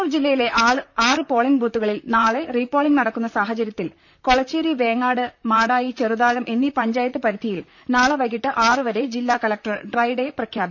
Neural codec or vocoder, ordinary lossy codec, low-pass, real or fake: vocoder, 44.1 kHz, 128 mel bands, Pupu-Vocoder; none; 7.2 kHz; fake